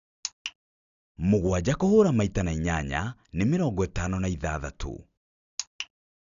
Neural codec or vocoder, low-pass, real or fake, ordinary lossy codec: none; 7.2 kHz; real; none